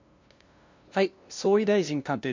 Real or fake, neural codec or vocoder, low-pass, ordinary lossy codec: fake; codec, 16 kHz, 0.5 kbps, FunCodec, trained on LibriTTS, 25 frames a second; 7.2 kHz; MP3, 48 kbps